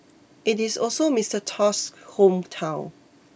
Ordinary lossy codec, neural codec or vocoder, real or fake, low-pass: none; none; real; none